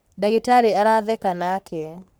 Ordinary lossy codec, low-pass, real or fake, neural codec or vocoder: none; none; fake; codec, 44.1 kHz, 3.4 kbps, Pupu-Codec